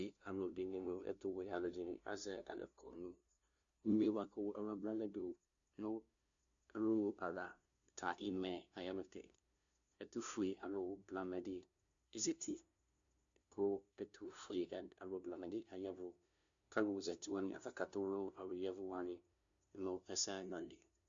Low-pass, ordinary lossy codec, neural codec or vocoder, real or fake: 7.2 kHz; AAC, 64 kbps; codec, 16 kHz, 0.5 kbps, FunCodec, trained on LibriTTS, 25 frames a second; fake